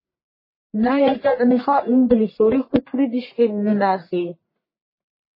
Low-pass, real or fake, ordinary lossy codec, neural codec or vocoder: 5.4 kHz; fake; MP3, 24 kbps; codec, 44.1 kHz, 1.7 kbps, Pupu-Codec